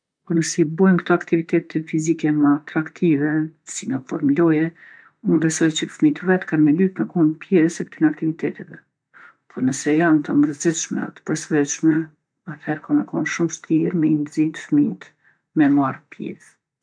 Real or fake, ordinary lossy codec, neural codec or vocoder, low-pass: fake; none; vocoder, 44.1 kHz, 128 mel bands, Pupu-Vocoder; 9.9 kHz